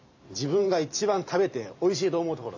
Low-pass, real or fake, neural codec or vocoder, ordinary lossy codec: 7.2 kHz; fake; vocoder, 44.1 kHz, 128 mel bands every 512 samples, BigVGAN v2; AAC, 48 kbps